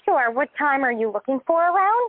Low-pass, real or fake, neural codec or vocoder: 5.4 kHz; real; none